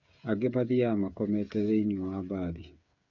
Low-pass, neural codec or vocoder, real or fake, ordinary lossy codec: 7.2 kHz; codec, 16 kHz, 8 kbps, FreqCodec, smaller model; fake; none